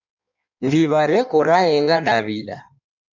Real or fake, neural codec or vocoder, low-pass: fake; codec, 16 kHz in and 24 kHz out, 1.1 kbps, FireRedTTS-2 codec; 7.2 kHz